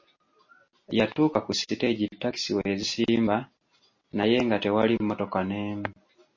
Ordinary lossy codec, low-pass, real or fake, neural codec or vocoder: MP3, 32 kbps; 7.2 kHz; real; none